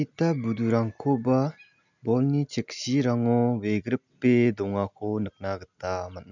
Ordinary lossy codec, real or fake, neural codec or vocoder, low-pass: none; real; none; 7.2 kHz